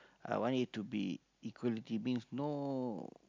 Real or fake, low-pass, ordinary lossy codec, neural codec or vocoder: real; 7.2 kHz; MP3, 64 kbps; none